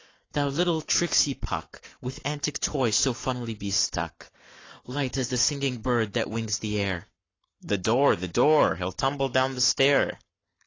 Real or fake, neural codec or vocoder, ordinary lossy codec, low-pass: fake; codec, 44.1 kHz, 7.8 kbps, Pupu-Codec; AAC, 32 kbps; 7.2 kHz